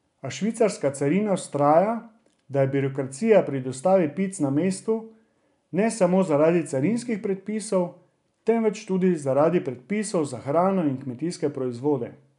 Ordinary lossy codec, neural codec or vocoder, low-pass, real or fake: none; none; 10.8 kHz; real